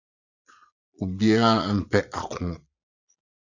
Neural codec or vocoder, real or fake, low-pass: vocoder, 22.05 kHz, 80 mel bands, Vocos; fake; 7.2 kHz